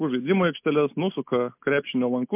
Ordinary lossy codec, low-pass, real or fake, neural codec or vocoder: MP3, 32 kbps; 3.6 kHz; real; none